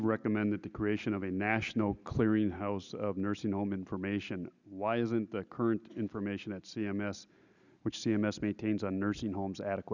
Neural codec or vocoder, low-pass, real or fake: none; 7.2 kHz; real